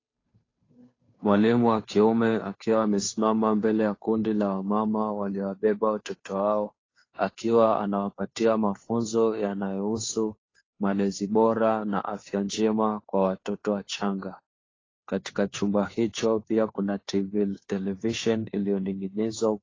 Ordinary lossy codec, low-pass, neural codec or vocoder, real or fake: AAC, 32 kbps; 7.2 kHz; codec, 16 kHz, 2 kbps, FunCodec, trained on Chinese and English, 25 frames a second; fake